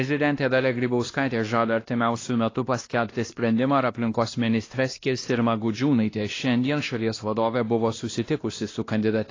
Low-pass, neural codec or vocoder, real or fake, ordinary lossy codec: 7.2 kHz; codec, 16 kHz, 1 kbps, X-Codec, WavLM features, trained on Multilingual LibriSpeech; fake; AAC, 32 kbps